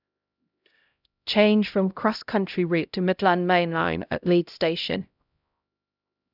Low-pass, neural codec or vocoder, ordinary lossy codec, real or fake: 5.4 kHz; codec, 16 kHz, 0.5 kbps, X-Codec, HuBERT features, trained on LibriSpeech; none; fake